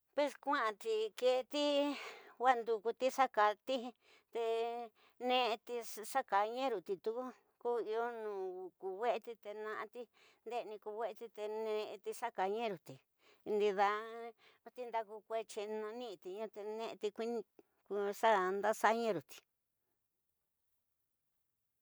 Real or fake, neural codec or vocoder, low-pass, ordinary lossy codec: real; none; none; none